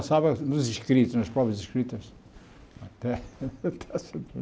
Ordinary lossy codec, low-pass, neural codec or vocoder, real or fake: none; none; none; real